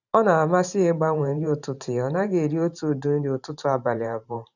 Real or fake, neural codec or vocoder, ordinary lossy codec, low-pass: fake; vocoder, 44.1 kHz, 128 mel bands every 512 samples, BigVGAN v2; none; 7.2 kHz